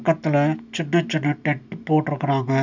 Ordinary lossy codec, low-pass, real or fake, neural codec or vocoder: none; 7.2 kHz; real; none